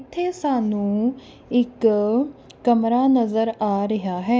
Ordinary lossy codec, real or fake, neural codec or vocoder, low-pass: none; real; none; none